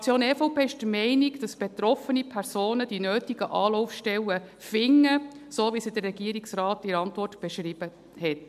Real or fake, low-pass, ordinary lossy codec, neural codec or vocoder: real; 14.4 kHz; MP3, 96 kbps; none